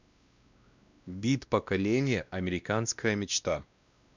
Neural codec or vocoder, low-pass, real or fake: codec, 16 kHz, 1 kbps, X-Codec, WavLM features, trained on Multilingual LibriSpeech; 7.2 kHz; fake